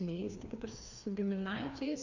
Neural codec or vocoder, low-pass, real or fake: codec, 16 kHz, 2 kbps, FreqCodec, larger model; 7.2 kHz; fake